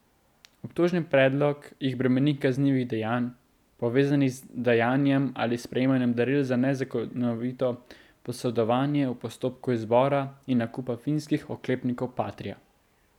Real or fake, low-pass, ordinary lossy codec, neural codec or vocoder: real; 19.8 kHz; none; none